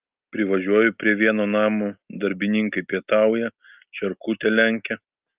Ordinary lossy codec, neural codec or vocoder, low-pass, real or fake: Opus, 24 kbps; none; 3.6 kHz; real